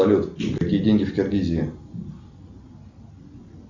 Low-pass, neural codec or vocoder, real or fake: 7.2 kHz; none; real